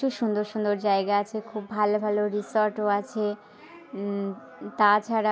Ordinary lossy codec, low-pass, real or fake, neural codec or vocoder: none; none; real; none